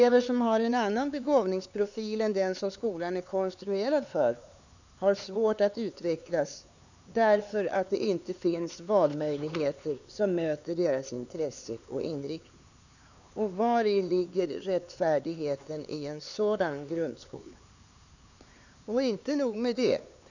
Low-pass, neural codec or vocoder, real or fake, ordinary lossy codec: 7.2 kHz; codec, 16 kHz, 4 kbps, X-Codec, HuBERT features, trained on LibriSpeech; fake; none